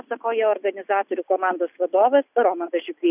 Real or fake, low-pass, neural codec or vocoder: real; 3.6 kHz; none